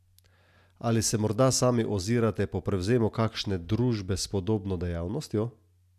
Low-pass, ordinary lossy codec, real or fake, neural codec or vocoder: 14.4 kHz; none; real; none